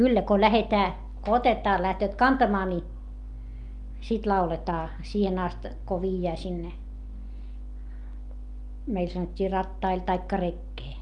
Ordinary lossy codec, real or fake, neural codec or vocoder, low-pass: Opus, 32 kbps; real; none; 10.8 kHz